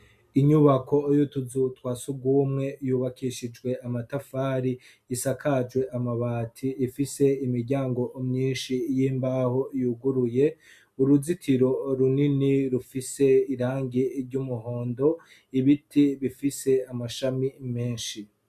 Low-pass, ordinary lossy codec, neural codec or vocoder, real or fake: 14.4 kHz; MP3, 96 kbps; none; real